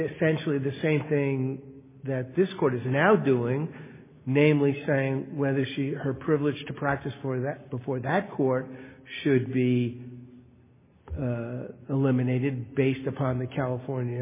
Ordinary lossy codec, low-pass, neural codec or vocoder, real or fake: MP3, 16 kbps; 3.6 kHz; none; real